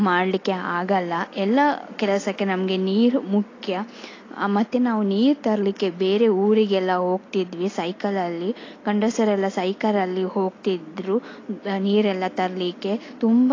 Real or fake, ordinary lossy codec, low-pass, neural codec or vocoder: real; AAC, 32 kbps; 7.2 kHz; none